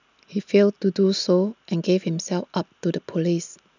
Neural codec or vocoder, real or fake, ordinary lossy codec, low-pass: none; real; none; 7.2 kHz